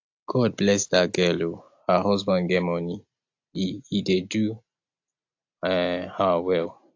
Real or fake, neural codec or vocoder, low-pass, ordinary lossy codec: real; none; 7.2 kHz; AAC, 48 kbps